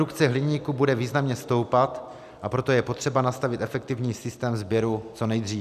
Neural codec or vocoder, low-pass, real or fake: none; 14.4 kHz; real